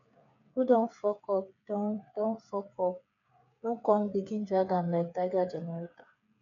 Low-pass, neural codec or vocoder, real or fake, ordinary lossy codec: 7.2 kHz; codec, 16 kHz, 8 kbps, FreqCodec, smaller model; fake; none